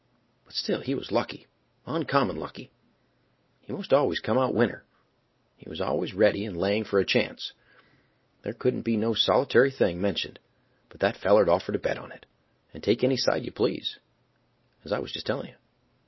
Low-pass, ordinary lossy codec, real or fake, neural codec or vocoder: 7.2 kHz; MP3, 24 kbps; real; none